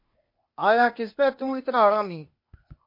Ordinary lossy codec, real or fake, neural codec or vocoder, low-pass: MP3, 32 kbps; fake; codec, 16 kHz, 0.8 kbps, ZipCodec; 5.4 kHz